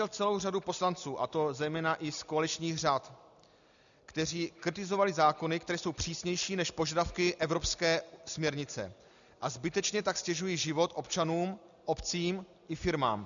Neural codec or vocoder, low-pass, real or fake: none; 7.2 kHz; real